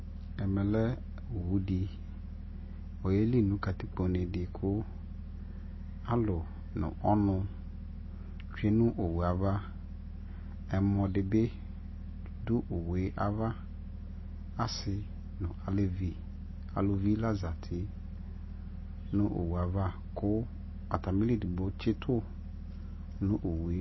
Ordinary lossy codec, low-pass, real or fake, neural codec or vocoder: MP3, 24 kbps; 7.2 kHz; real; none